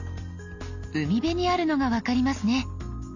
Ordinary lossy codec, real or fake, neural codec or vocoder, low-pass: none; real; none; 7.2 kHz